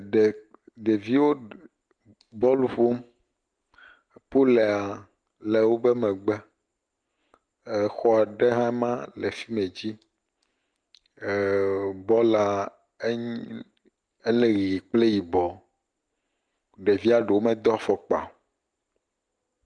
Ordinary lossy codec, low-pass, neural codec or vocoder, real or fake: Opus, 32 kbps; 9.9 kHz; none; real